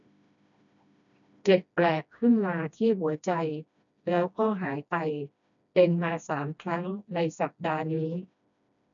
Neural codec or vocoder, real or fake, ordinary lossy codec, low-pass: codec, 16 kHz, 1 kbps, FreqCodec, smaller model; fake; none; 7.2 kHz